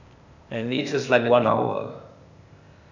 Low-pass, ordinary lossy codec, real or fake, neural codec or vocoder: 7.2 kHz; none; fake; codec, 16 kHz, 0.8 kbps, ZipCodec